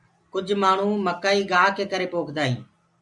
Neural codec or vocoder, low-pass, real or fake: none; 10.8 kHz; real